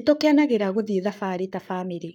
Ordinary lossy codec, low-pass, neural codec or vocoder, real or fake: none; 19.8 kHz; codec, 44.1 kHz, 7.8 kbps, Pupu-Codec; fake